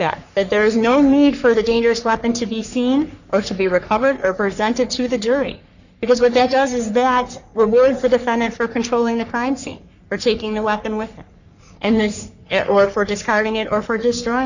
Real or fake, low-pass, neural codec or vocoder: fake; 7.2 kHz; codec, 44.1 kHz, 3.4 kbps, Pupu-Codec